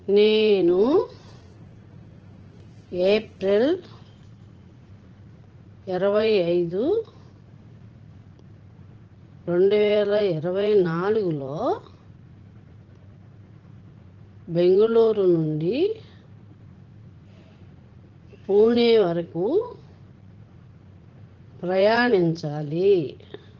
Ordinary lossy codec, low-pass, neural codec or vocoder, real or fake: Opus, 16 kbps; 7.2 kHz; vocoder, 44.1 kHz, 80 mel bands, Vocos; fake